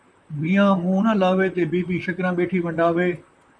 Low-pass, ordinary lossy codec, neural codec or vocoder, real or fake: 9.9 kHz; MP3, 96 kbps; vocoder, 44.1 kHz, 128 mel bands, Pupu-Vocoder; fake